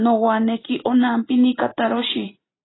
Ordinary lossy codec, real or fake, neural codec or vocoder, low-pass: AAC, 16 kbps; real; none; 7.2 kHz